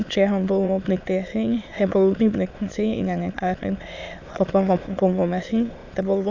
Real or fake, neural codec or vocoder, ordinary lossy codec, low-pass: fake; autoencoder, 22.05 kHz, a latent of 192 numbers a frame, VITS, trained on many speakers; AAC, 48 kbps; 7.2 kHz